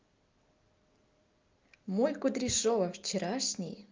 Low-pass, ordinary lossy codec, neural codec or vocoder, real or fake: 7.2 kHz; Opus, 32 kbps; none; real